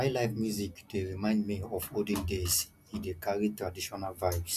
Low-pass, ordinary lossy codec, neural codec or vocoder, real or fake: 14.4 kHz; none; none; real